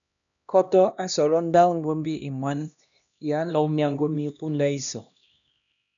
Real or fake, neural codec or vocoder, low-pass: fake; codec, 16 kHz, 1 kbps, X-Codec, HuBERT features, trained on LibriSpeech; 7.2 kHz